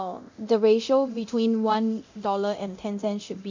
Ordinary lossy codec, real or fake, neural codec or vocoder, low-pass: MP3, 64 kbps; fake; codec, 24 kHz, 0.9 kbps, DualCodec; 7.2 kHz